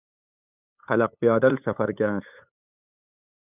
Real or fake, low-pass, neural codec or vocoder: fake; 3.6 kHz; codec, 16 kHz, 8 kbps, FunCodec, trained on LibriTTS, 25 frames a second